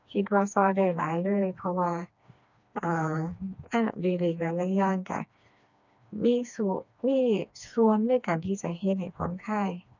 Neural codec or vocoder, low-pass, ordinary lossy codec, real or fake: codec, 16 kHz, 2 kbps, FreqCodec, smaller model; 7.2 kHz; none; fake